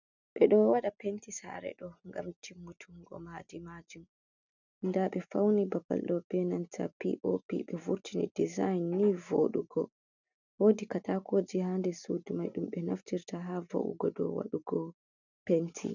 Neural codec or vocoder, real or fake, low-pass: none; real; 7.2 kHz